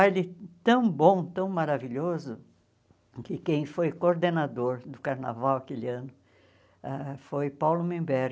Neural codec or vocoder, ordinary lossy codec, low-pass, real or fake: none; none; none; real